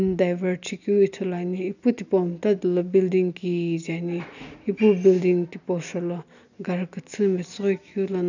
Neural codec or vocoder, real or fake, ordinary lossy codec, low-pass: none; real; none; 7.2 kHz